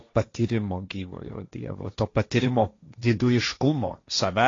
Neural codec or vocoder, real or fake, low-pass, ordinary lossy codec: codec, 16 kHz, 1.1 kbps, Voila-Tokenizer; fake; 7.2 kHz; AAC, 32 kbps